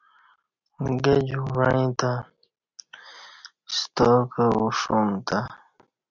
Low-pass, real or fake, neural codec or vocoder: 7.2 kHz; real; none